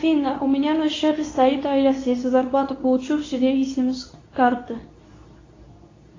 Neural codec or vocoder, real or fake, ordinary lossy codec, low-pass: codec, 24 kHz, 0.9 kbps, WavTokenizer, medium speech release version 2; fake; AAC, 32 kbps; 7.2 kHz